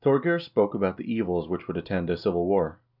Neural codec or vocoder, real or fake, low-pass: none; real; 5.4 kHz